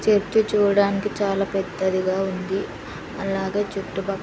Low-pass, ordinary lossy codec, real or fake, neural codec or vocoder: none; none; real; none